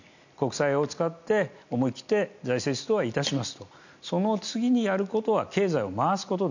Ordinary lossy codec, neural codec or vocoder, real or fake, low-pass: none; none; real; 7.2 kHz